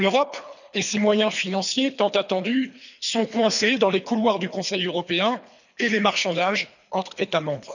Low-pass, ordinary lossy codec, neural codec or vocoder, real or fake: 7.2 kHz; none; codec, 24 kHz, 3 kbps, HILCodec; fake